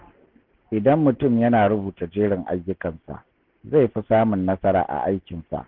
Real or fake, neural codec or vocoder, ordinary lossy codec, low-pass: real; none; Opus, 16 kbps; 5.4 kHz